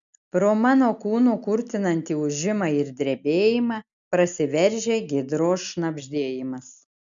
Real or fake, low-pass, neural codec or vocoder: real; 7.2 kHz; none